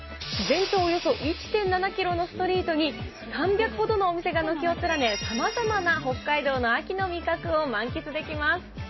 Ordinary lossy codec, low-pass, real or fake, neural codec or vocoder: MP3, 24 kbps; 7.2 kHz; real; none